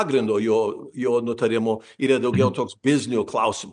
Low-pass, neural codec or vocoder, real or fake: 9.9 kHz; none; real